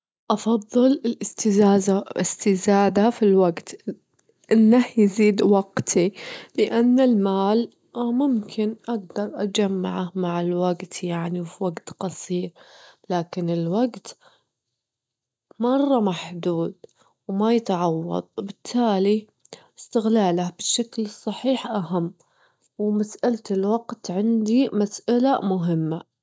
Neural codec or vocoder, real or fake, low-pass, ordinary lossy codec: none; real; none; none